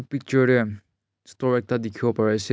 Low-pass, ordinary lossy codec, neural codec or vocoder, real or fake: none; none; none; real